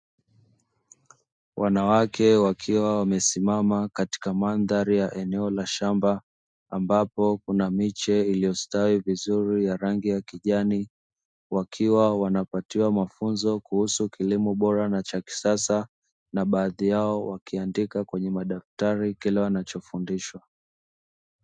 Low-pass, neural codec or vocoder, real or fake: 9.9 kHz; none; real